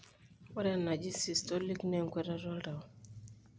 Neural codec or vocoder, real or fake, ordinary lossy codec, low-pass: none; real; none; none